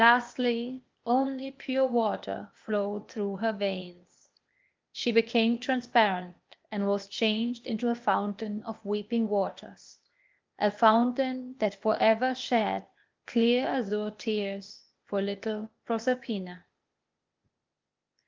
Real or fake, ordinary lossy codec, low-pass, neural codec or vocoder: fake; Opus, 32 kbps; 7.2 kHz; codec, 16 kHz, 0.8 kbps, ZipCodec